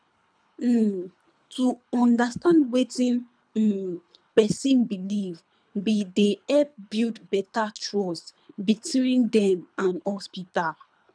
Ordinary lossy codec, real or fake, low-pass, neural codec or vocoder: none; fake; 9.9 kHz; codec, 24 kHz, 3 kbps, HILCodec